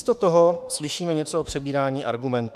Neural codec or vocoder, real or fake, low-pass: autoencoder, 48 kHz, 32 numbers a frame, DAC-VAE, trained on Japanese speech; fake; 14.4 kHz